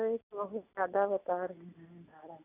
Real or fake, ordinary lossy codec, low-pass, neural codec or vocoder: real; none; 3.6 kHz; none